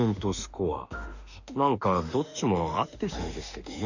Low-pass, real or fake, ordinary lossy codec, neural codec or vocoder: 7.2 kHz; fake; none; autoencoder, 48 kHz, 32 numbers a frame, DAC-VAE, trained on Japanese speech